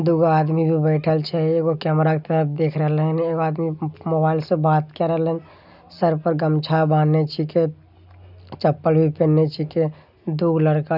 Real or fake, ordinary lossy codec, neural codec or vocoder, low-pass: real; none; none; 5.4 kHz